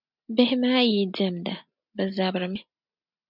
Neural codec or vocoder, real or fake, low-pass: none; real; 5.4 kHz